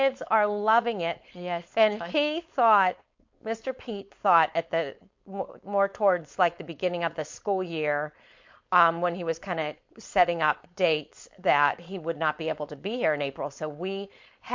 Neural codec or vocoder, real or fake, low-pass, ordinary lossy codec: codec, 16 kHz, 4.8 kbps, FACodec; fake; 7.2 kHz; MP3, 48 kbps